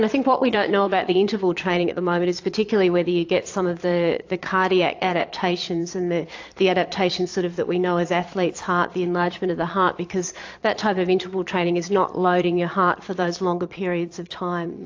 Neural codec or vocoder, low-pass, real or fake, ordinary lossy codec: vocoder, 22.05 kHz, 80 mel bands, Vocos; 7.2 kHz; fake; AAC, 48 kbps